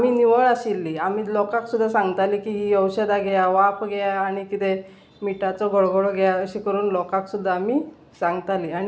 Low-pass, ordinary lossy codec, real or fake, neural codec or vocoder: none; none; real; none